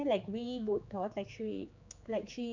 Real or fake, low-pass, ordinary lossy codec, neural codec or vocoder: fake; 7.2 kHz; MP3, 64 kbps; codec, 16 kHz, 4 kbps, X-Codec, HuBERT features, trained on general audio